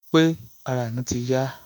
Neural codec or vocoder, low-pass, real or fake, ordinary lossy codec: autoencoder, 48 kHz, 32 numbers a frame, DAC-VAE, trained on Japanese speech; none; fake; none